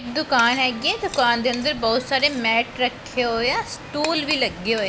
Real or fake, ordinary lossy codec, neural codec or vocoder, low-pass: real; none; none; none